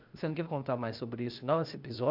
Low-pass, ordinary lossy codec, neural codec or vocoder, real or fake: 5.4 kHz; none; codec, 16 kHz, 0.8 kbps, ZipCodec; fake